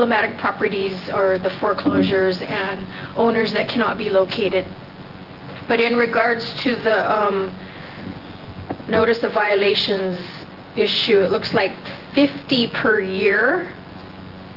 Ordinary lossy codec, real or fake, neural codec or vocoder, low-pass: Opus, 16 kbps; fake; vocoder, 24 kHz, 100 mel bands, Vocos; 5.4 kHz